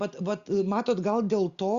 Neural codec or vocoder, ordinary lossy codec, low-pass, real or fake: none; Opus, 64 kbps; 7.2 kHz; real